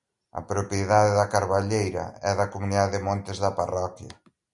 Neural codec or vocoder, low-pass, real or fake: none; 10.8 kHz; real